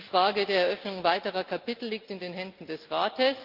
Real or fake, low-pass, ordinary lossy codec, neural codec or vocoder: real; 5.4 kHz; Opus, 24 kbps; none